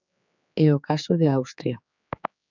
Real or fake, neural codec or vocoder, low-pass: fake; codec, 16 kHz, 4 kbps, X-Codec, HuBERT features, trained on general audio; 7.2 kHz